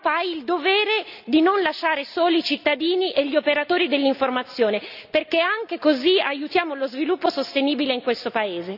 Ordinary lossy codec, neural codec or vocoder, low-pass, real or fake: none; none; 5.4 kHz; real